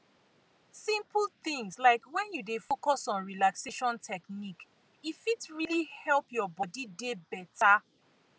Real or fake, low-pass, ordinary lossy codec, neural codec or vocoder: real; none; none; none